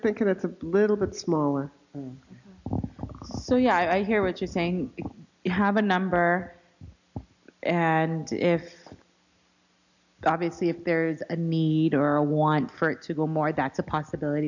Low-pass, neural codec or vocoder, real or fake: 7.2 kHz; none; real